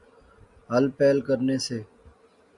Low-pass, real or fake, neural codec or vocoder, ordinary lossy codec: 10.8 kHz; real; none; Opus, 64 kbps